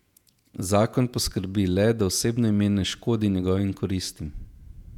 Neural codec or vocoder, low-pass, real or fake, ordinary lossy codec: vocoder, 48 kHz, 128 mel bands, Vocos; 19.8 kHz; fake; none